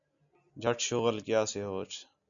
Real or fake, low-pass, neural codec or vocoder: real; 7.2 kHz; none